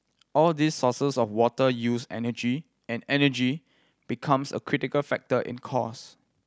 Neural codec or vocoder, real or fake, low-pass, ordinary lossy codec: none; real; none; none